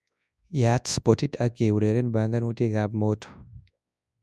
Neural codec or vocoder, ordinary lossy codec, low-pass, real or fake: codec, 24 kHz, 0.9 kbps, WavTokenizer, large speech release; none; none; fake